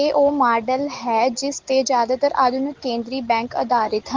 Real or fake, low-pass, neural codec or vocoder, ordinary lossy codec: real; 7.2 kHz; none; Opus, 16 kbps